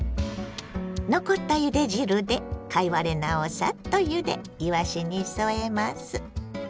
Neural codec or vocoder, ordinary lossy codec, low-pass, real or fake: none; none; none; real